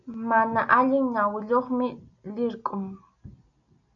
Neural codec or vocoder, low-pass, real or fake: none; 7.2 kHz; real